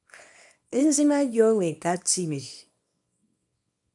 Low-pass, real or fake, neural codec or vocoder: 10.8 kHz; fake; codec, 24 kHz, 0.9 kbps, WavTokenizer, small release